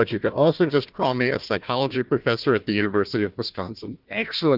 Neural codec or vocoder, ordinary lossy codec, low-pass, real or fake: codec, 16 kHz, 1 kbps, FunCodec, trained on Chinese and English, 50 frames a second; Opus, 24 kbps; 5.4 kHz; fake